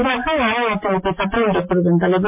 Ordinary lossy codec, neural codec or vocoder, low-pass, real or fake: MP3, 32 kbps; none; 3.6 kHz; real